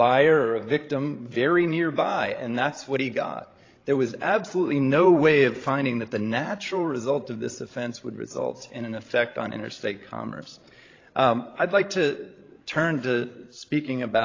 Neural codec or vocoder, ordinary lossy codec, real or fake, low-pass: codec, 16 kHz, 16 kbps, FreqCodec, larger model; AAC, 32 kbps; fake; 7.2 kHz